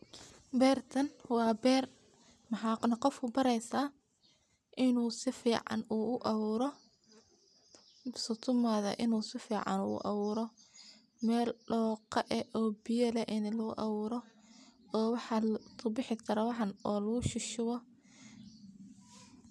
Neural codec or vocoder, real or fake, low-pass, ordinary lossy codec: none; real; none; none